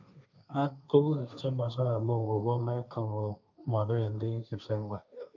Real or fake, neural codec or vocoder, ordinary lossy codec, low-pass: fake; codec, 16 kHz, 1.1 kbps, Voila-Tokenizer; none; 7.2 kHz